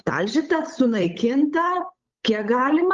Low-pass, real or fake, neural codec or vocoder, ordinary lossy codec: 7.2 kHz; fake; codec, 16 kHz, 8 kbps, FunCodec, trained on Chinese and English, 25 frames a second; Opus, 32 kbps